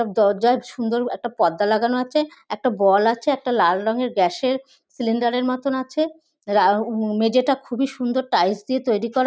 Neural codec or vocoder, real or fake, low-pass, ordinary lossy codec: none; real; none; none